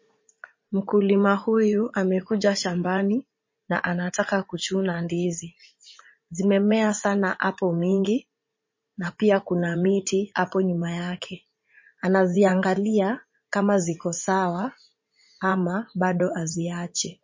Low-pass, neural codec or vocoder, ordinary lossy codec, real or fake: 7.2 kHz; vocoder, 44.1 kHz, 80 mel bands, Vocos; MP3, 32 kbps; fake